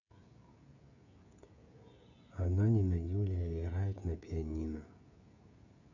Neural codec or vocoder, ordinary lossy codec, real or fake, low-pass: codec, 16 kHz, 16 kbps, FreqCodec, smaller model; none; fake; 7.2 kHz